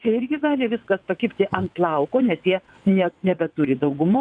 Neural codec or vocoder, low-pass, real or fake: vocoder, 22.05 kHz, 80 mel bands, WaveNeXt; 9.9 kHz; fake